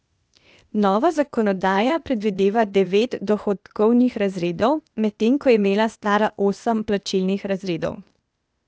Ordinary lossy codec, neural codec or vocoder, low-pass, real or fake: none; codec, 16 kHz, 0.8 kbps, ZipCodec; none; fake